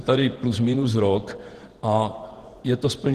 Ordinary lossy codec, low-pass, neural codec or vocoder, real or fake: Opus, 16 kbps; 14.4 kHz; vocoder, 48 kHz, 128 mel bands, Vocos; fake